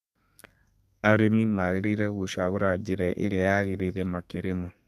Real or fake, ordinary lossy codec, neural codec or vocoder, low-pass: fake; none; codec, 32 kHz, 1.9 kbps, SNAC; 14.4 kHz